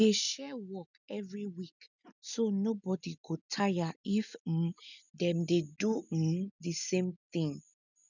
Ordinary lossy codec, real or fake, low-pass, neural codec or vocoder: none; real; 7.2 kHz; none